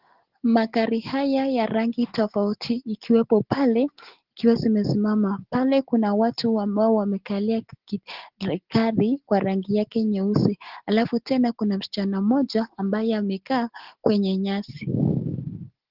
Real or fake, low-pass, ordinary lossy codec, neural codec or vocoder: real; 5.4 kHz; Opus, 16 kbps; none